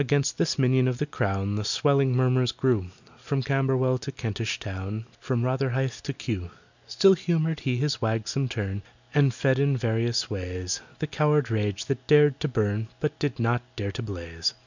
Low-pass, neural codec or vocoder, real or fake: 7.2 kHz; none; real